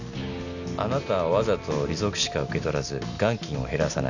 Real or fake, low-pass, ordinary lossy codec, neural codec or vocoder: real; 7.2 kHz; none; none